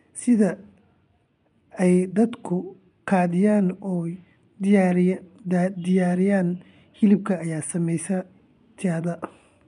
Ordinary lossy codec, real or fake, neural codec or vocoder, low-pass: none; fake; vocoder, 24 kHz, 100 mel bands, Vocos; 10.8 kHz